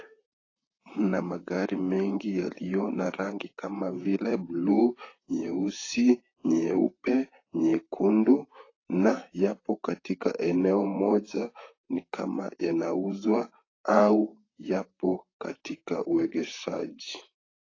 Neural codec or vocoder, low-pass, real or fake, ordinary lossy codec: vocoder, 44.1 kHz, 128 mel bands, Pupu-Vocoder; 7.2 kHz; fake; AAC, 32 kbps